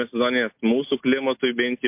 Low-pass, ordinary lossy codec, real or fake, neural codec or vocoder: 3.6 kHz; MP3, 32 kbps; real; none